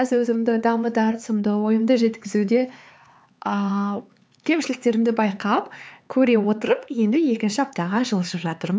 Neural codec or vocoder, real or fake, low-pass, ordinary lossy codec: codec, 16 kHz, 4 kbps, X-Codec, HuBERT features, trained on LibriSpeech; fake; none; none